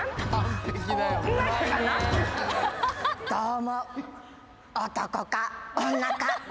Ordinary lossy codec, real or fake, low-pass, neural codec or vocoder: none; real; none; none